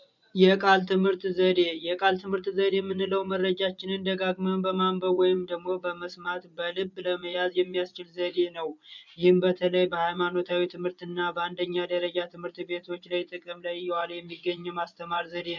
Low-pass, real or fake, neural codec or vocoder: 7.2 kHz; fake; vocoder, 24 kHz, 100 mel bands, Vocos